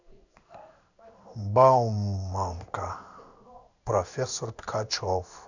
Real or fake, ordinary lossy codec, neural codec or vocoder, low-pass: fake; none; codec, 16 kHz in and 24 kHz out, 1 kbps, XY-Tokenizer; 7.2 kHz